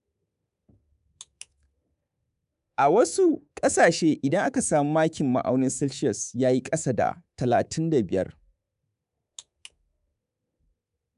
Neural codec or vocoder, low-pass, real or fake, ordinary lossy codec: codec, 24 kHz, 3.1 kbps, DualCodec; 10.8 kHz; fake; MP3, 96 kbps